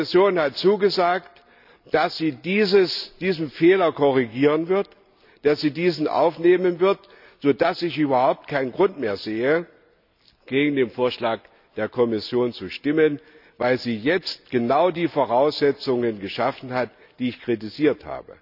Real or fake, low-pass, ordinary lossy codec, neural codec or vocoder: real; 5.4 kHz; none; none